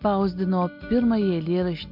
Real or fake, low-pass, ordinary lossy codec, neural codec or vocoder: real; 5.4 kHz; MP3, 48 kbps; none